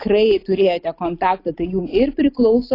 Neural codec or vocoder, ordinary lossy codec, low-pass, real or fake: none; AAC, 32 kbps; 5.4 kHz; real